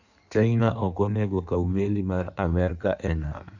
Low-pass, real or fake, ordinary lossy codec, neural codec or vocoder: 7.2 kHz; fake; none; codec, 16 kHz in and 24 kHz out, 1.1 kbps, FireRedTTS-2 codec